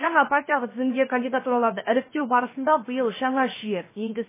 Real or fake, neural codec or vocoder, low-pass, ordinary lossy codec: fake; codec, 16 kHz, 0.7 kbps, FocalCodec; 3.6 kHz; MP3, 16 kbps